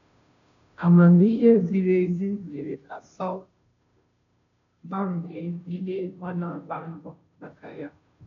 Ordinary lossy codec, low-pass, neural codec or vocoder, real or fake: none; 7.2 kHz; codec, 16 kHz, 0.5 kbps, FunCodec, trained on Chinese and English, 25 frames a second; fake